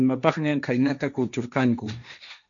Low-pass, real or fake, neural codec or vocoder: 7.2 kHz; fake; codec, 16 kHz, 1.1 kbps, Voila-Tokenizer